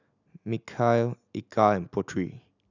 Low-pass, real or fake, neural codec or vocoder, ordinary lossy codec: 7.2 kHz; real; none; none